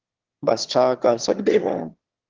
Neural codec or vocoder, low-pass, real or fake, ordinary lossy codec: autoencoder, 22.05 kHz, a latent of 192 numbers a frame, VITS, trained on one speaker; 7.2 kHz; fake; Opus, 16 kbps